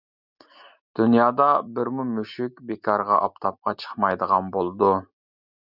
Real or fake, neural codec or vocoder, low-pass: real; none; 5.4 kHz